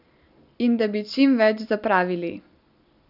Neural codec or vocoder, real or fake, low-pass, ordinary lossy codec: none; real; 5.4 kHz; none